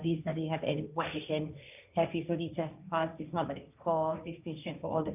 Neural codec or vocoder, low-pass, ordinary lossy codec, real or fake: codec, 16 kHz, 1.1 kbps, Voila-Tokenizer; 3.6 kHz; none; fake